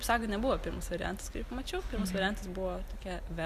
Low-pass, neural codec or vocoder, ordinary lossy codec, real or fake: 14.4 kHz; none; Opus, 64 kbps; real